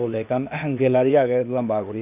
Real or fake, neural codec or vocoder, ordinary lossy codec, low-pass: fake; codec, 16 kHz, 0.8 kbps, ZipCodec; none; 3.6 kHz